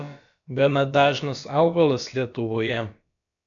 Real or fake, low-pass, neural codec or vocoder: fake; 7.2 kHz; codec, 16 kHz, about 1 kbps, DyCAST, with the encoder's durations